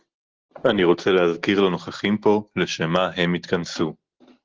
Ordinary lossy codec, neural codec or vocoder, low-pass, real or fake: Opus, 16 kbps; none; 7.2 kHz; real